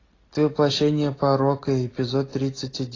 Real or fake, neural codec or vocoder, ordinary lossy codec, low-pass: real; none; AAC, 32 kbps; 7.2 kHz